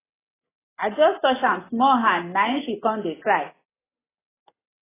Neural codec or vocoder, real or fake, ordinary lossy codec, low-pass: none; real; AAC, 16 kbps; 3.6 kHz